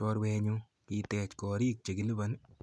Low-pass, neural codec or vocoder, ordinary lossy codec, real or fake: none; none; none; real